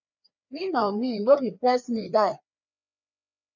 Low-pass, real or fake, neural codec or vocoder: 7.2 kHz; fake; codec, 16 kHz, 2 kbps, FreqCodec, larger model